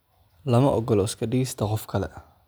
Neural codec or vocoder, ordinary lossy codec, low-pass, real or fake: none; none; none; real